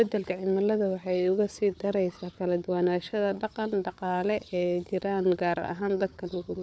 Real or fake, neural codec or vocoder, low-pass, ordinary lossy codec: fake; codec, 16 kHz, 4 kbps, FunCodec, trained on Chinese and English, 50 frames a second; none; none